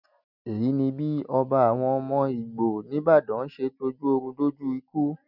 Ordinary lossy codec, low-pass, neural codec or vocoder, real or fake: none; 5.4 kHz; none; real